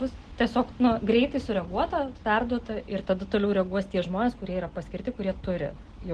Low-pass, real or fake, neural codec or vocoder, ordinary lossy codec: 10.8 kHz; real; none; Opus, 16 kbps